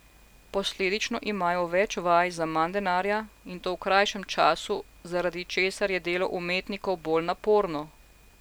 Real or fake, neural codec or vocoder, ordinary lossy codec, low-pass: real; none; none; none